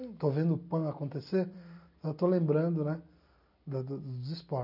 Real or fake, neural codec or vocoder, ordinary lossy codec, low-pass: real; none; none; 5.4 kHz